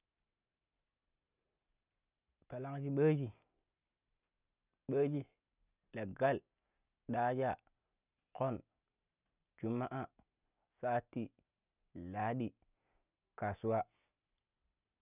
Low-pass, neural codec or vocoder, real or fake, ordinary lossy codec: 3.6 kHz; none; real; none